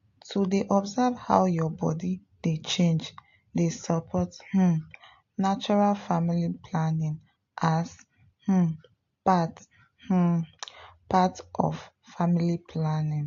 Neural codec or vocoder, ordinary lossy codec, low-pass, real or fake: none; AAC, 48 kbps; 7.2 kHz; real